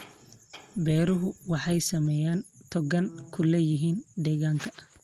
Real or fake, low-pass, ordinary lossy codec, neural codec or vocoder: real; 14.4 kHz; Opus, 24 kbps; none